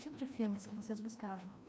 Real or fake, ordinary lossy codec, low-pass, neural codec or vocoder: fake; none; none; codec, 16 kHz, 1 kbps, FreqCodec, larger model